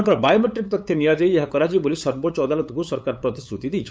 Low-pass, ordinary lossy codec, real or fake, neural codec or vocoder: none; none; fake; codec, 16 kHz, 8 kbps, FunCodec, trained on LibriTTS, 25 frames a second